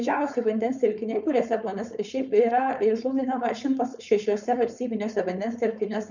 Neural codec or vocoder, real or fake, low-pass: codec, 16 kHz, 4.8 kbps, FACodec; fake; 7.2 kHz